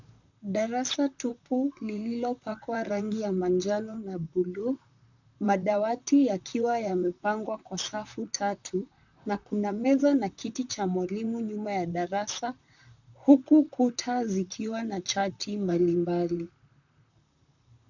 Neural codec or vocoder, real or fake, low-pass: vocoder, 44.1 kHz, 128 mel bands, Pupu-Vocoder; fake; 7.2 kHz